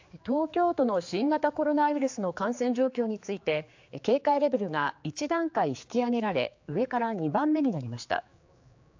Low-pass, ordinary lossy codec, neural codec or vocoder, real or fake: 7.2 kHz; AAC, 48 kbps; codec, 16 kHz, 4 kbps, X-Codec, HuBERT features, trained on general audio; fake